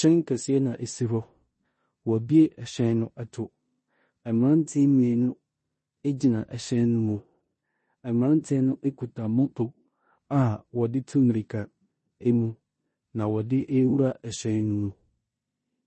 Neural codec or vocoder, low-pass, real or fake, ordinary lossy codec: codec, 16 kHz in and 24 kHz out, 0.9 kbps, LongCat-Audio-Codec, four codebook decoder; 10.8 kHz; fake; MP3, 32 kbps